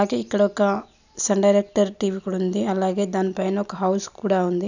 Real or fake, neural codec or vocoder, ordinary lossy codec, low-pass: real; none; none; 7.2 kHz